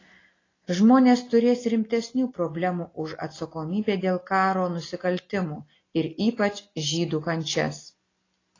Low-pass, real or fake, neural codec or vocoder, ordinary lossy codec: 7.2 kHz; real; none; AAC, 32 kbps